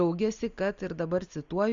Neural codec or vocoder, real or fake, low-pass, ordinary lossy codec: none; real; 7.2 kHz; Opus, 64 kbps